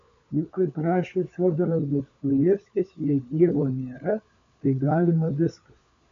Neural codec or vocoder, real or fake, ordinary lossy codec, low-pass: codec, 16 kHz, 4 kbps, FunCodec, trained on LibriTTS, 50 frames a second; fake; AAC, 64 kbps; 7.2 kHz